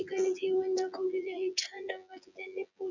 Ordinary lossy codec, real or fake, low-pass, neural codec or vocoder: none; fake; 7.2 kHz; vocoder, 24 kHz, 100 mel bands, Vocos